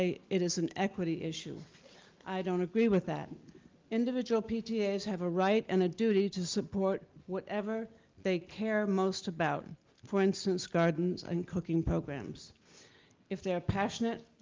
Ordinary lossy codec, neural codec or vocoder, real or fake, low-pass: Opus, 32 kbps; none; real; 7.2 kHz